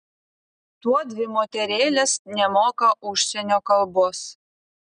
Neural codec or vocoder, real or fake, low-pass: none; real; 9.9 kHz